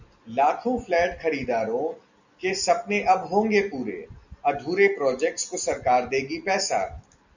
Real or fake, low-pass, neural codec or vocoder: real; 7.2 kHz; none